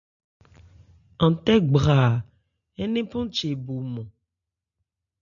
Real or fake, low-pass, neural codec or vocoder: real; 7.2 kHz; none